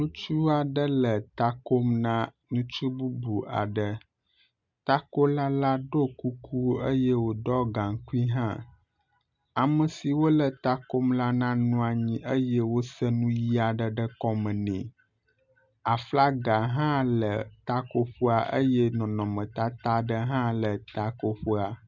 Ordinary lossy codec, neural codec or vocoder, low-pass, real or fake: MP3, 64 kbps; none; 7.2 kHz; real